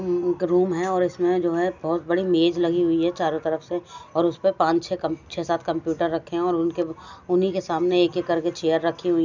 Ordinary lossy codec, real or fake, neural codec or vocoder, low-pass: none; real; none; 7.2 kHz